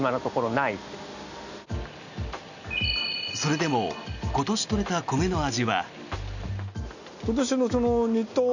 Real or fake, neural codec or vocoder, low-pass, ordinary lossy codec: real; none; 7.2 kHz; none